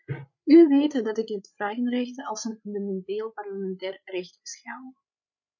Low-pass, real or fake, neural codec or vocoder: 7.2 kHz; fake; codec, 16 kHz, 8 kbps, FreqCodec, larger model